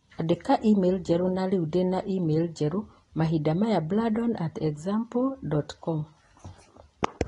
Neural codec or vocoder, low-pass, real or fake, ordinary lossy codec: none; 10.8 kHz; real; AAC, 32 kbps